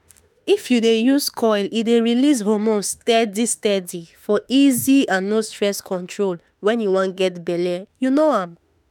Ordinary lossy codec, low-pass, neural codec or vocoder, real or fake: none; 19.8 kHz; autoencoder, 48 kHz, 32 numbers a frame, DAC-VAE, trained on Japanese speech; fake